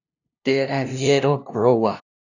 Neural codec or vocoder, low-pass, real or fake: codec, 16 kHz, 0.5 kbps, FunCodec, trained on LibriTTS, 25 frames a second; 7.2 kHz; fake